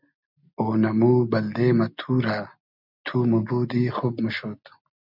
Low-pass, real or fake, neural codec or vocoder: 5.4 kHz; real; none